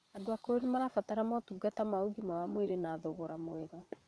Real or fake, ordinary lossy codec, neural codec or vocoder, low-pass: fake; none; vocoder, 22.05 kHz, 80 mel bands, Vocos; none